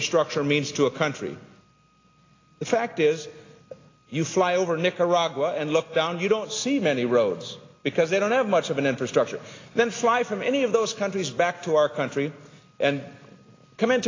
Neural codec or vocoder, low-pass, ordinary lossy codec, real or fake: none; 7.2 kHz; AAC, 32 kbps; real